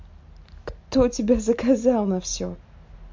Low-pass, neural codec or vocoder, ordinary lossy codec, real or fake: 7.2 kHz; none; MP3, 48 kbps; real